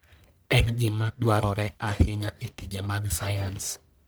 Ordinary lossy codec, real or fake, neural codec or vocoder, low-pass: none; fake; codec, 44.1 kHz, 1.7 kbps, Pupu-Codec; none